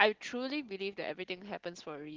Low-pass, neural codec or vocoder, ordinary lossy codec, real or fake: 7.2 kHz; none; Opus, 16 kbps; real